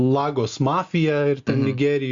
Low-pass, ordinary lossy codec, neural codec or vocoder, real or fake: 7.2 kHz; Opus, 64 kbps; none; real